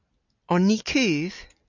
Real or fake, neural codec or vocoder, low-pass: real; none; 7.2 kHz